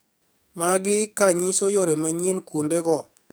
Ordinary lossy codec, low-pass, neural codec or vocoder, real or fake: none; none; codec, 44.1 kHz, 2.6 kbps, SNAC; fake